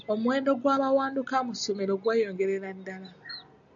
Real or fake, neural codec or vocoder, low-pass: real; none; 7.2 kHz